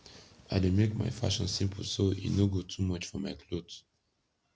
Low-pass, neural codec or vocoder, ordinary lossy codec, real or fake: none; none; none; real